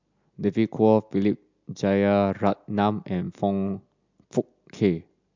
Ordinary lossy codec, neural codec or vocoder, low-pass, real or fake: MP3, 64 kbps; none; 7.2 kHz; real